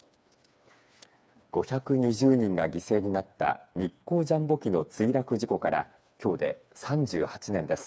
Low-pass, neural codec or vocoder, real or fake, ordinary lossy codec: none; codec, 16 kHz, 4 kbps, FreqCodec, smaller model; fake; none